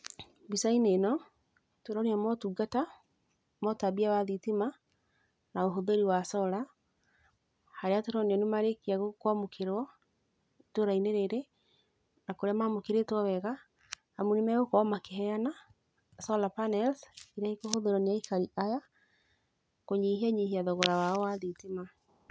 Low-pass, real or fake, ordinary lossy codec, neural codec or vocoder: none; real; none; none